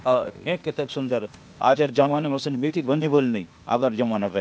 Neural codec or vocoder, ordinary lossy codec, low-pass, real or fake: codec, 16 kHz, 0.8 kbps, ZipCodec; none; none; fake